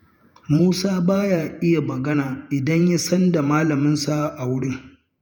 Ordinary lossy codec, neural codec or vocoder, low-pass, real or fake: none; vocoder, 48 kHz, 128 mel bands, Vocos; none; fake